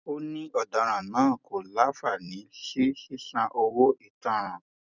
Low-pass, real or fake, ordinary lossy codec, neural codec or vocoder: 7.2 kHz; fake; none; vocoder, 44.1 kHz, 128 mel bands every 256 samples, BigVGAN v2